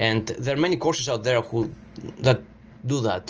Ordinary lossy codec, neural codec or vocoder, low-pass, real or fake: Opus, 24 kbps; none; 7.2 kHz; real